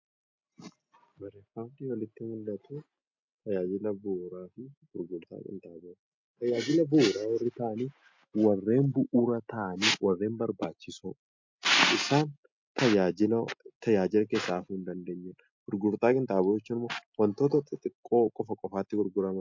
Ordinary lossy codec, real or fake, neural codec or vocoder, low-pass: MP3, 48 kbps; real; none; 7.2 kHz